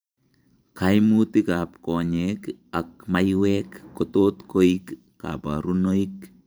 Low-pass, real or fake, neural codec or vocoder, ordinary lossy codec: none; fake; vocoder, 44.1 kHz, 128 mel bands every 512 samples, BigVGAN v2; none